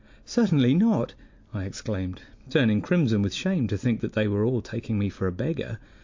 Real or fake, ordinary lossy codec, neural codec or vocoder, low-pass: real; MP3, 48 kbps; none; 7.2 kHz